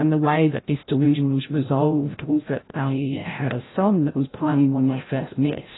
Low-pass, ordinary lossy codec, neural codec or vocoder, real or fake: 7.2 kHz; AAC, 16 kbps; codec, 16 kHz, 0.5 kbps, FreqCodec, larger model; fake